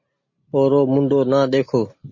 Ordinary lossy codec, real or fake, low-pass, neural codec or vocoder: MP3, 32 kbps; real; 7.2 kHz; none